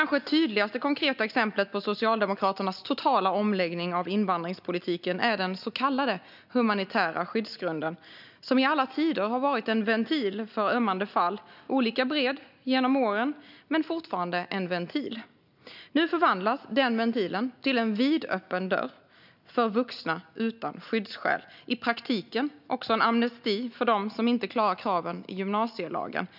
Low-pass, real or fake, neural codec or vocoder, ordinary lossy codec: 5.4 kHz; real; none; none